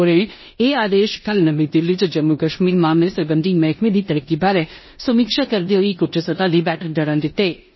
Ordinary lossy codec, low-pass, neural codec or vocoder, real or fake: MP3, 24 kbps; 7.2 kHz; codec, 16 kHz in and 24 kHz out, 0.9 kbps, LongCat-Audio-Codec, four codebook decoder; fake